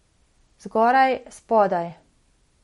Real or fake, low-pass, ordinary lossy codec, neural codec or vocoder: real; 19.8 kHz; MP3, 48 kbps; none